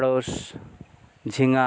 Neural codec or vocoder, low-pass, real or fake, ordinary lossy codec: none; none; real; none